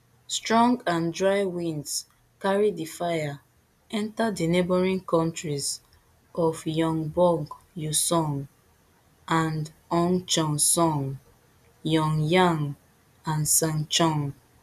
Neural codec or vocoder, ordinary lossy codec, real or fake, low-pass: none; none; real; 14.4 kHz